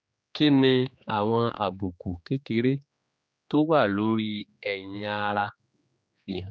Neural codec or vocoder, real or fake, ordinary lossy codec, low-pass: codec, 16 kHz, 2 kbps, X-Codec, HuBERT features, trained on general audio; fake; none; none